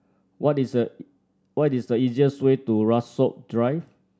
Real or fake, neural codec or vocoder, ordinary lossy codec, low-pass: real; none; none; none